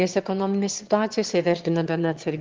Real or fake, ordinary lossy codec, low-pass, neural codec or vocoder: fake; Opus, 16 kbps; 7.2 kHz; autoencoder, 22.05 kHz, a latent of 192 numbers a frame, VITS, trained on one speaker